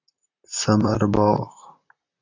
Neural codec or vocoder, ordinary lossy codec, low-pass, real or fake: vocoder, 44.1 kHz, 128 mel bands, Pupu-Vocoder; AAC, 48 kbps; 7.2 kHz; fake